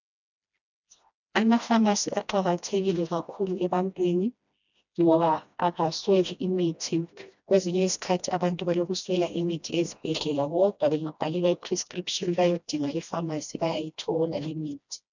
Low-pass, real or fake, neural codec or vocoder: 7.2 kHz; fake; codec, 16 kHz, 1 kbps, FreqCodec, smaller model